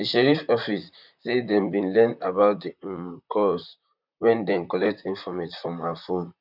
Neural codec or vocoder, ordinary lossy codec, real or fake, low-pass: vocoder, 44.1 kHz, 128 mel bands, Pupu-Vocoder; none; fake; 5.4 kHz